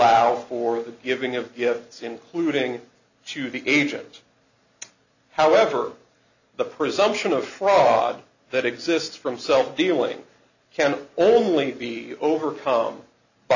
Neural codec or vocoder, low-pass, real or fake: none; 7.2 kHz; real